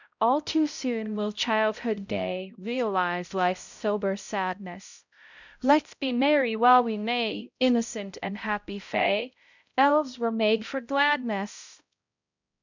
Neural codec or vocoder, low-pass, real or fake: codec, 16 kHz, 0.5 kbps, X-Codec, HuBERT features, trained on balanced general audio; 7.2 kHz; fake